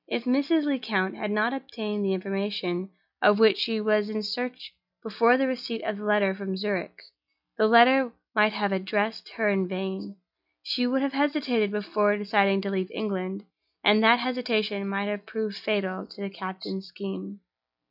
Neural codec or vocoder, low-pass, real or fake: none; 5.4 kHz; real